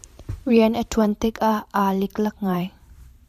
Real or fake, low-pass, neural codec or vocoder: fake; 14.4 kHz; vocoder, 44.1 kHz, 128 mel bands every 512 samples, BigVGAN v2